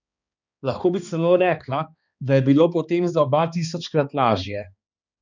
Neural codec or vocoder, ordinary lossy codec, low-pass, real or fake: codec, 16 kHz, 2 kbps, X-Codec, HuBERT features, trained on balanced general audio; none; 7.2 kHz; fake